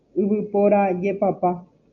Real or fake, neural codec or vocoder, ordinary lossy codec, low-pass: real; none; MP3, 64 kbps; 7.2 kHz